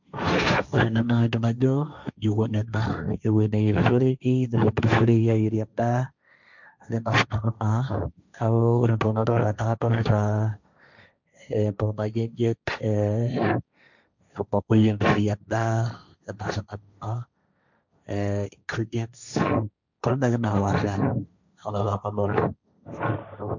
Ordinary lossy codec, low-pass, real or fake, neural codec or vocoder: none; 7.2 kHz; fake; codec, 16 kHz, 1.1 kbps, Voila-Tokenizer